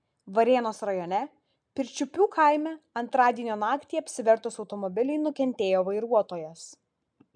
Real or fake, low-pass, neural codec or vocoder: real; 9.9 kHz; none